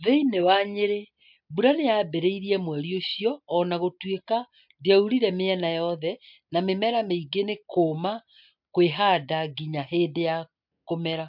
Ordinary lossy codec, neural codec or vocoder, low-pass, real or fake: MP3, 48 kbps; none; 5.4 kHz; real